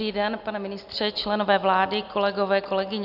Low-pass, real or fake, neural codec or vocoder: 5.4 kHz; real; none